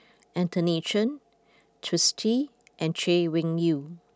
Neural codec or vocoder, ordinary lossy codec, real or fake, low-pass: none; none; real; none